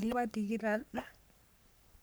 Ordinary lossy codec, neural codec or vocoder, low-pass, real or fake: none; codec, 44.1 kHz, 3.4 kbps, Pupu-Codec; none; fake